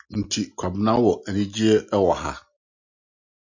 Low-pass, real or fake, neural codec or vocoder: 7.2 kHz; real; none